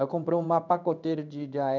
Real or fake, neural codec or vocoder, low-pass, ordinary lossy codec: fake; codec, 16 kHz in and 24 kHz out, 1 kbps, XY-Tokenizer; 7.2 kHz; none